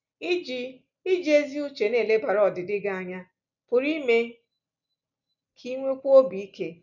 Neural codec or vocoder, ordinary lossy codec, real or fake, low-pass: none; none; real; 7.2 kHz